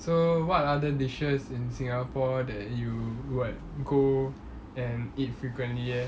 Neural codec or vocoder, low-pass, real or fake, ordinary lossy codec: none; none; real; none